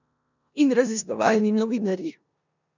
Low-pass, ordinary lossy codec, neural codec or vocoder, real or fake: 7.2 kHz; none; codec, 16 kHz in and 24 kHz out, 0.9 kbps, LongCat-Audio-Codec, four codebook decoder; fake